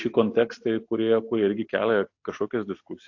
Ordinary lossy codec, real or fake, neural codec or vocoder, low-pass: AAC, 48 kbps; real; none; 7.2 kHz